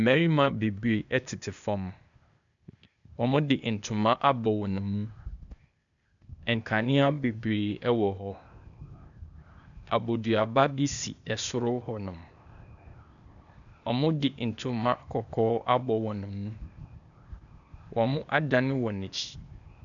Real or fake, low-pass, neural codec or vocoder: fake; 7.2 kHz; codec, 16 kHz, 0.8 kbps, ZipCodec